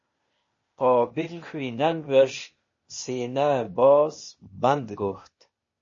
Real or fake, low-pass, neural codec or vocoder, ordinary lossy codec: fake; 7.2 kHz; codec, 16 kHz, 0.8 kbps, ZipCodec; MP3, 32 kbps